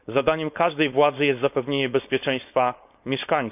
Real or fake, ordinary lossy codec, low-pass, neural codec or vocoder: fake; none; 3.6 kHz; codec, 16 kHz, 4.8 kbps, FACodec